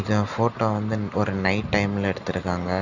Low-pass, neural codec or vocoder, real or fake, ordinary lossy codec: 7.2 kHz; none; real; none